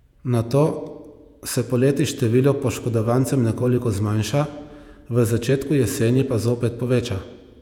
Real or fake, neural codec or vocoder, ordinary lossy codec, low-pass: fake; vocoder, 48 kHz, 128 mel bands, Vocos; none; 19.8 kHz